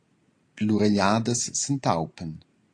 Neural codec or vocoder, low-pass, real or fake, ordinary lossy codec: none; 9.9 kHz; real; AAC, 48 kbps